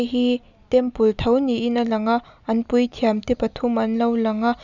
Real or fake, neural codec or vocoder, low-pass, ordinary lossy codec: real; none; 7.2 kHz; none